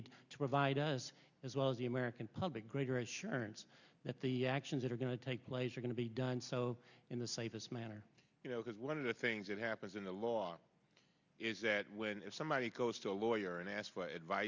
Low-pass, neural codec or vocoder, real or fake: 7.2 kHz; none; real